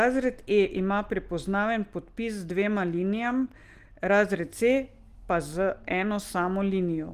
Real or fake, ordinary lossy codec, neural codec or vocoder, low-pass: real; Opus, 24 kbps; none; 14.4 kHz